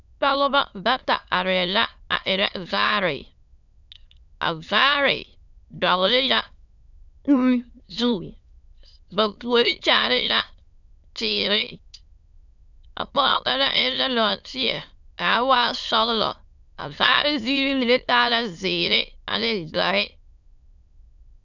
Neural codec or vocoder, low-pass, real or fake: autoencoder, 22.05 kHz, a latent of 192 numbers a frame, VITS, trained on many speakers; 7.2 kHz; fake